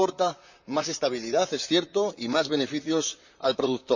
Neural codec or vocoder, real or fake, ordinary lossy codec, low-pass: vocoder, 44.1 kHz, 128 mel bands, Pupu-Vocoder; fake; none; 7.2 kHz